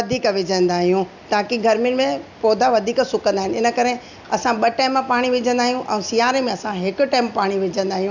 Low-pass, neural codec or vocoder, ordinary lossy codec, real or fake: 7.2 kHz; none; none; real